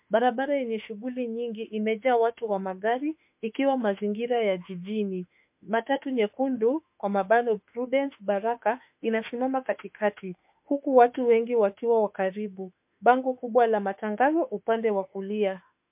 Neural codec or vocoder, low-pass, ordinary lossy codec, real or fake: autoencoder, 48 kHz, 32 numbers a frame, DAC-VAE, trained on Japanese speech; 3.6 kHz; MP3, 32 kbps; fake